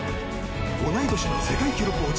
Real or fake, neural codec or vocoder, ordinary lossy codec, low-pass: real; none; none; none